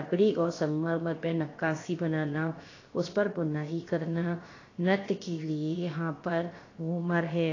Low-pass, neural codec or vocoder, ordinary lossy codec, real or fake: 7.2 kHz; codec, 16 kHz, about 1 kbps, DyCAST, with the encoder's durations; AAC, 32 kbps; fake